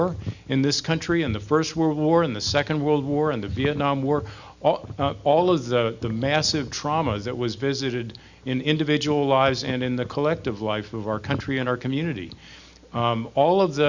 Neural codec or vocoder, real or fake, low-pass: none; real; 7.2 kHz